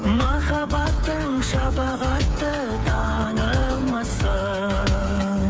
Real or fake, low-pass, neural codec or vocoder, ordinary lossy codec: fake; none; codec, 16 kHz, 8 kbps, FreqCodec, smaller model; none